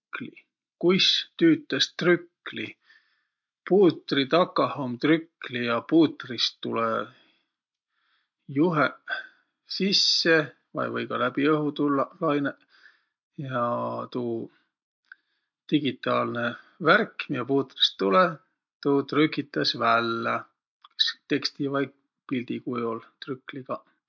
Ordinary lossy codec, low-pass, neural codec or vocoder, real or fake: MP3, 48 kbps; 7.2 kHz; none; real